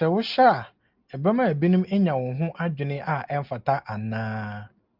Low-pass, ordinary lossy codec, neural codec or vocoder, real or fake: 5.4 kHz; Opus, 24 kbps; none; real